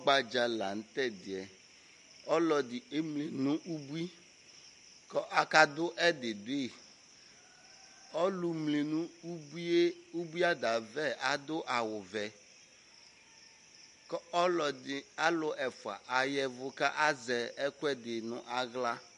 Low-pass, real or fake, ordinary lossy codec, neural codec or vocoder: 14.4 kHz; real; MP3, 48 kbps; none